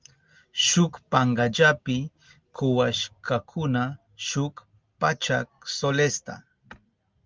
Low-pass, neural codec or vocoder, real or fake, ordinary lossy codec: 7.2 kHz; none; real; Opus, 24 kbps